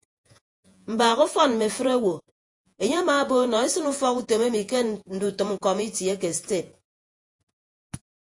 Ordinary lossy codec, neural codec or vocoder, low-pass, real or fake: AAC, 64 kbps; vocoder, 48 kHz, 128 mel bands, Vocos; 10.8 kHz; fake